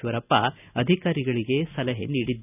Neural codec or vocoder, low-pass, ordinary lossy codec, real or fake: none; 3.6 kHz; none; real